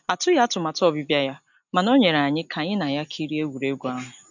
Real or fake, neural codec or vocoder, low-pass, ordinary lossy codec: real; none; 7.2 kHz; none